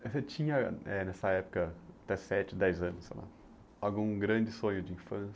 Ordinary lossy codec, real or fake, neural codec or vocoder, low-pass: none; real; none; none